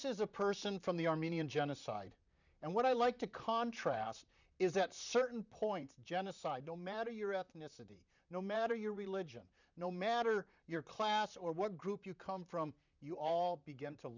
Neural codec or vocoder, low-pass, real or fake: vocoder, 44.1 kHz, 128 mel bands, Pupu-Vocoder; 7.2 kHz; fake